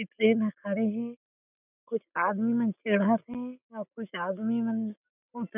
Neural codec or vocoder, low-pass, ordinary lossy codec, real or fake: none; 3.6 kHz; none; real